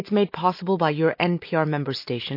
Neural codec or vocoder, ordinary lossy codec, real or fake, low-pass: none; MP3, 32 kbps; real; 5.4 kHz